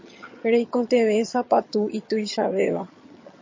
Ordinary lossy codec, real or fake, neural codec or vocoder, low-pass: MP3, 32 kbps; fake; vocoder, 22.05 kHz, 80 mel bands, HiFi-GAN; 7.2 kHz